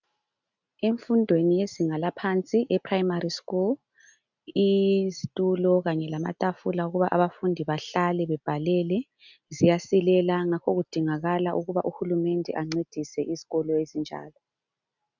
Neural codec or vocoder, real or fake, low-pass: none; real; 7.2 kHz